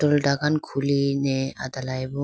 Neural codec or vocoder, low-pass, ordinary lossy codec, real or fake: none; none; none; real